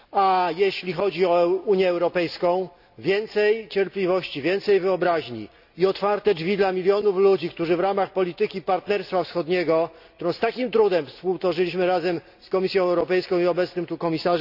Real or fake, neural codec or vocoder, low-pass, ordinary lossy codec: real; none; 5.4 kHz; none